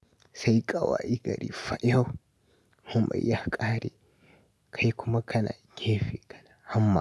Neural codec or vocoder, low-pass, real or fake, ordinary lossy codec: none; none; real; none